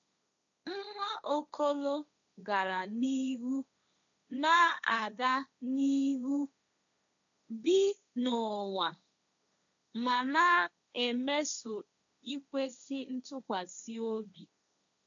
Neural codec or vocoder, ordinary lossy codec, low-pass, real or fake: codec, 16 kHz, 1.1 kbps, Voila-Tokenizer; none; 7.2 kHz; fake